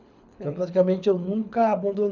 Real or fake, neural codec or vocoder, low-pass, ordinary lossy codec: fake; codec, 24 kHz, 6 kbps, HILCodec; 7.2 kHz; none